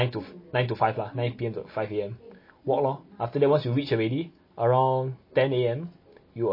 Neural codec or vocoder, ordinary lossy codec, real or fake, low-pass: none; MP3, 24 kbps; real; 5.4 kHz